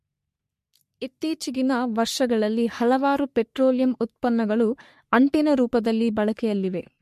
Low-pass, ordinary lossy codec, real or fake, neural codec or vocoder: 14.4 kHz; MP3, 64 kbps; fake; codec, 44.1 kHz, 3.4 kbps, Pupu-Codec